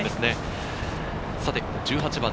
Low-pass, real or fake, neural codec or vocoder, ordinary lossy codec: none; real; none; none